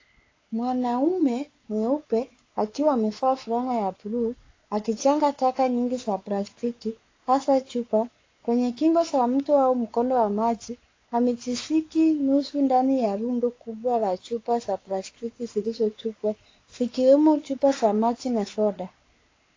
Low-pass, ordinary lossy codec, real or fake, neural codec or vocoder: 7.2 kHz; AAC, 32 kbps; fake; codec, 16 kHz, 4 kbps, X-Codec, WavLM features, trained on Multilingual LibriSpeech